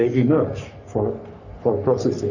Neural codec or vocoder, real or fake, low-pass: codec, 44.1 kHz, 3.4 kbps, Pupu-Codec; fake; 7.2 kHz